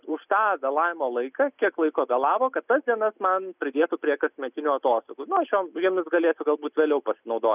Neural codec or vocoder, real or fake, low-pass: none; real; 3.6 kHz